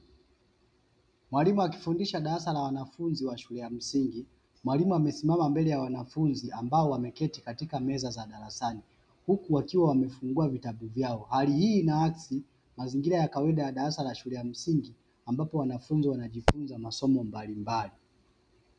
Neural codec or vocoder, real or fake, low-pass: none; real; 9.9 kHz